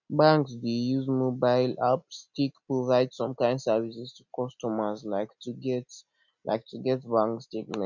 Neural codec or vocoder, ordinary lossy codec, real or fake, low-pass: none; none; real; 7.2 kHz